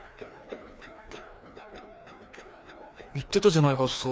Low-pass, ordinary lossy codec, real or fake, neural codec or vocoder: none; none; fake; codec, 16 kHz, 2 kbps, FreqCodec, larger model